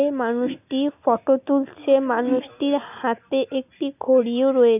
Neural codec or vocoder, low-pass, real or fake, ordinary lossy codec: none; 3.6 kHz; real; none